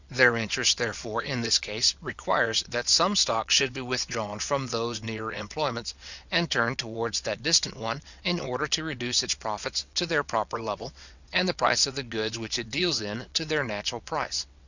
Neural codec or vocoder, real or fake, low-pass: vocoder, 22.05 kHz, 80 mel bands, WaveNeXt; fake; 7.2 kHz